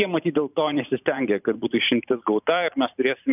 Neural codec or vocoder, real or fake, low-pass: vocoder, 44.1 kHz, 128 mel bands every 512 samples, BigVGAN v2; fake; 3.6 kHz